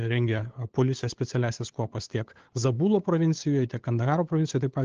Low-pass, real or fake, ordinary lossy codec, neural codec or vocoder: 7.2 kHz; fake; Opus, 16 kbps; codec, 16 kHz, 8 kbps, FunCodec, trained on LibriTTS, 25 frames a second